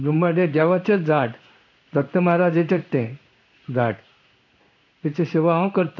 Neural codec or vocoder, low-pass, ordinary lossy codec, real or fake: codec, 16 kHz in and 24 kHz out, 1 kbps, XY-Tokenizer; 7.2 kHz; none; fake